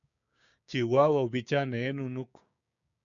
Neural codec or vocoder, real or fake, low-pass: codec, 16 kHz, 6 kbps, DAC; fake; 7.2 kHz